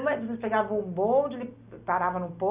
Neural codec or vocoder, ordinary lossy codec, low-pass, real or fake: none; Opus, 64 kbps; 3.6 kHz; real